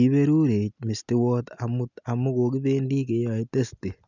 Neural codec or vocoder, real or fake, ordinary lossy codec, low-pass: none; real; none; 7.2 kHz